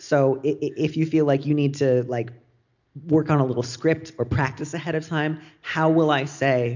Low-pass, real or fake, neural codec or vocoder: 7.2 kHz; real; none